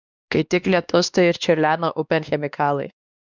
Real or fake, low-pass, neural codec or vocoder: fake; 7.2 kHz; codec, 16 kHz, 2 kbps, X-Codec, WavLM features, trained on Multilingual LibriSpeech